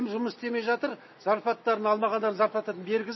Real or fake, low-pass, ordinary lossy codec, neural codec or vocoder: real; 7.2 kHz; MP3, 24 kbps; none